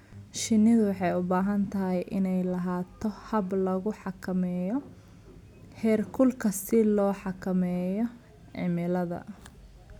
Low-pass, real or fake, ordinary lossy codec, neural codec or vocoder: 19.8 kHz; real; none; none